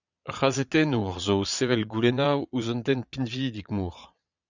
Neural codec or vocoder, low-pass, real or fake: vocoder, 22.05 kHz, 80 mel bands, Vocos; 7.2 kHz; fake